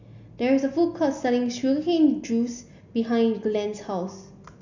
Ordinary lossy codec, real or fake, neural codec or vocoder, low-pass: none; real; none; 7.2 kHz